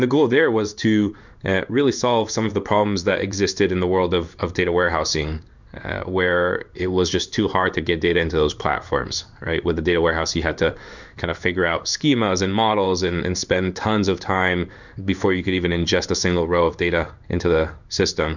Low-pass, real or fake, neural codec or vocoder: 7.2 kHz; fake; codec, 16 kHz in and 24 kHz out, 1 kbps, XY-Tokenizer